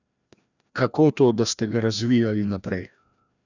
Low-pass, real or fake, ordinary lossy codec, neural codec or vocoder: 7.2 kHz; fake; none; codec, 16 kHz, 1 kbps, FreqCodec, larger model